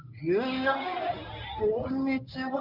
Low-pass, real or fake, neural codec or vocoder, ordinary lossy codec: 5.4 kHz; fake; codec, 24 kHz, 0.9 kbps, WavTokenizer, medium speech release version 1; none